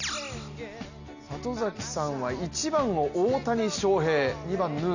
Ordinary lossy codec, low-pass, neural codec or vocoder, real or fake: none; 7.2 kHz; none; real